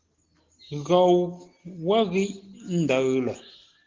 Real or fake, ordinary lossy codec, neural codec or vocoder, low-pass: real; Opus, 16 kbps; none; 7.2 kHz